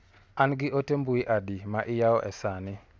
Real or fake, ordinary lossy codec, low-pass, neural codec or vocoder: real; none; none; none